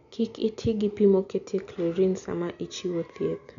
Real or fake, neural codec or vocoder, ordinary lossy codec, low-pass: real; none; none; 7.2 kHz